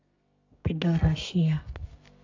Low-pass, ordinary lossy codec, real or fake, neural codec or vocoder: 7.2 kHz; AAC, 48 kbps; fake; codec, 32 kHz, 1.9 kbps, SNAC